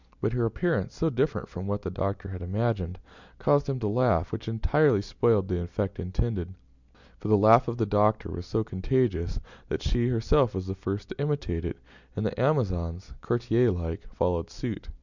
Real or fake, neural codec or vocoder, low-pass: real; none; 7.2 kHz